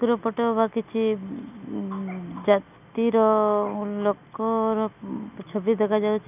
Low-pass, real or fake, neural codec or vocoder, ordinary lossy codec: 3.6 kHz; real; none; none